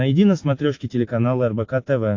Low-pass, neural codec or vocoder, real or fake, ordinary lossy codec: 7.2 kHz; none; real; AAC, 48 kbps